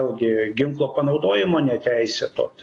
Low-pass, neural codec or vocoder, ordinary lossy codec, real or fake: 10.8 kHz; none; AAC, 32 kbps; real